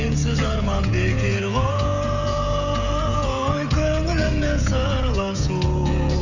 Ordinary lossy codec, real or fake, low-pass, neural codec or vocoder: none; fake; 7.2 kHz; codec, 16 kHz, 16 kbps, FreqCodec, smaller model